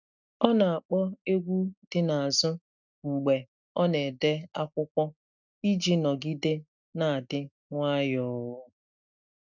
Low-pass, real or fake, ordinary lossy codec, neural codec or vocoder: 7.2 kHz; real; none; none